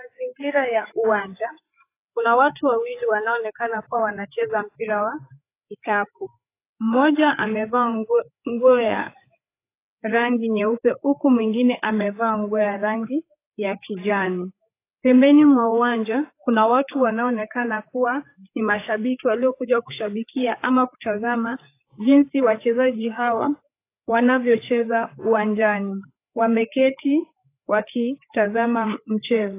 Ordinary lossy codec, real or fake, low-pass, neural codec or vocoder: AAC, 24 kbps; fake; 3.6 kHz; codec, 16 kHz, 4 kbps, FreqCodec, larger model